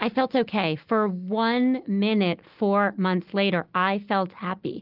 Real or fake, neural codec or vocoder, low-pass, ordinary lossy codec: real; none; 5.4 kHz; Opus, 32 kbps